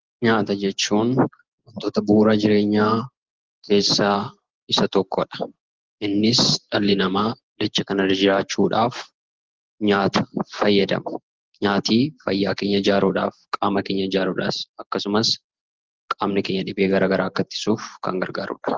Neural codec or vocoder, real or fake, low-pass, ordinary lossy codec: none; real; 7.2 kHz; Opus, 16 kbps